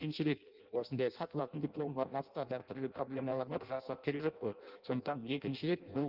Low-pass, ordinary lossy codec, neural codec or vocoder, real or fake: 5.4 kHz; Opus, 32 kbps; codec, 16 kHz in and 24 kHz out, 0.6 kbps, FireRedTTS-2 codec; fake